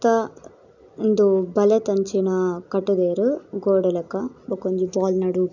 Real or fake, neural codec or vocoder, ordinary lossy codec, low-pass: real; none; none; 7.2 kHz